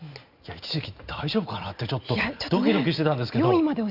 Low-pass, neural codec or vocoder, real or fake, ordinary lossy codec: 5.4 kHz; none; real; Opus, 64 kbps